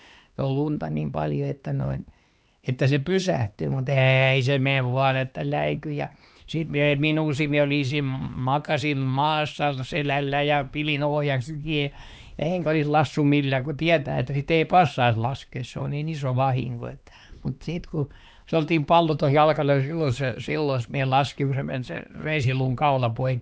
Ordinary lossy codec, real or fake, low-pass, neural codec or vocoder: none; fake; none; codec, 16 kHz, 2 kbps, X-Codec, HuBERT features, trained on LibriSpeech